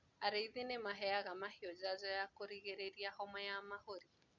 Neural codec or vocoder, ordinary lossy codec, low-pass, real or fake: none; none; 7.2 kHz; real